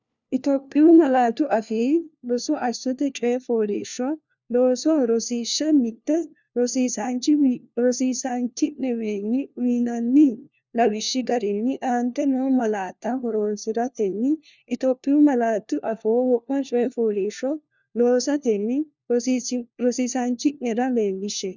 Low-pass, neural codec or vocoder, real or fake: 7.2 kHz; codec, 16 kHz, 1 kbps, FunCodec, trained on LibriTTS, 50 frames a second; fake